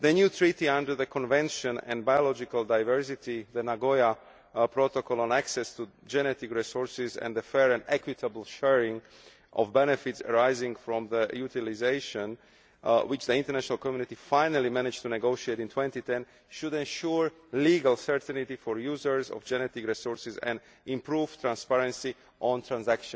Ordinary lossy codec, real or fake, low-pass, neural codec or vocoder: none; real; none; none